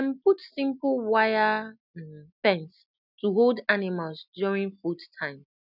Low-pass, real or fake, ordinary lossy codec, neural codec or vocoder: 5.4 kHz; real; none; none